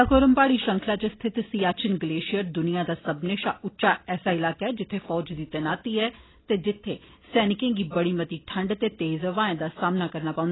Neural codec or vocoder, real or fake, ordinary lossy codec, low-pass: none; real; AAC, 16 kbps; 7.2 kHz